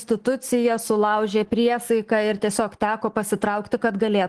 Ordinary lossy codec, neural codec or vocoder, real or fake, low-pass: Opus, 16 kbps; none; real; 10.8 kHz